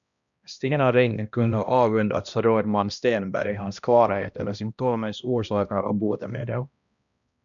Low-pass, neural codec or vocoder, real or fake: 7.2 kHz; codec, 16 kHz, 1 kbps, X-Codec, HuBERT features, trained on balanced general audio; fake